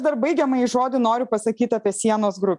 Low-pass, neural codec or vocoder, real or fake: 10.8 kHz; none; real